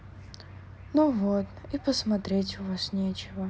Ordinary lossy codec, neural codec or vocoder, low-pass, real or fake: none; none; none; real